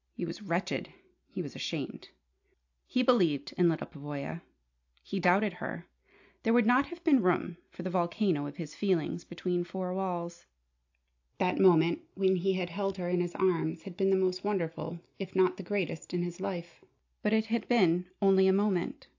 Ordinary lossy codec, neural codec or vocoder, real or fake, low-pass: AAC, 48 kbps; none; real; 7.2 kHz